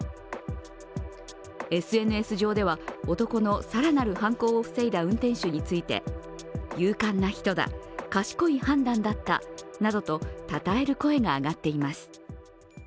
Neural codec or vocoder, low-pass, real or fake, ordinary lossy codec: none; none; real; none